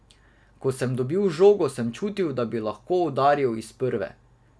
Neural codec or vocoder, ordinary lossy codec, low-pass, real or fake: none; none; none; real